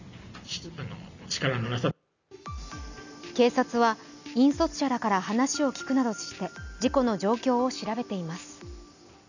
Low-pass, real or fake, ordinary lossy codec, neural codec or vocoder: 7.2 kHz; real; none; none